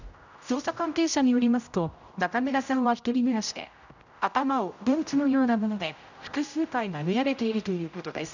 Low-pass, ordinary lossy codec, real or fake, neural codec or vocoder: 7.2 kHz; none; fake; codec, 16 kHz, 0.5 kbps, X-Codec, HuBERT features, trained on general audio